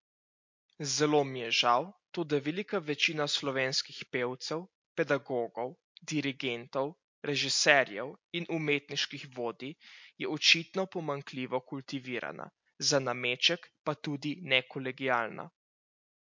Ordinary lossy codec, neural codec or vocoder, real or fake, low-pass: MP3, 48 kbps; none; real; 7.2 kHz